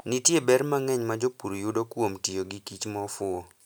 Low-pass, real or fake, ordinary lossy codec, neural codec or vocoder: none; real; none; none